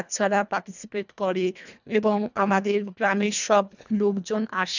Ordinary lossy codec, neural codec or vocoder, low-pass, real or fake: none; codec, 24 kHz, 1.5 kbps, HILCodec; 7.2 kHz; fake